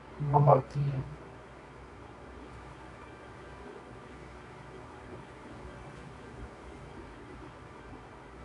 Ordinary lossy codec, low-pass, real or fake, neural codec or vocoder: MP3, 96 kbps; 10.8 kHz; fake; codec, 24 kHz, 0.9 kbps, WavTokenizer, medium music audio release